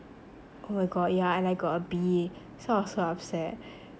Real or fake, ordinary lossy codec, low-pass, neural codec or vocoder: real; none; none; none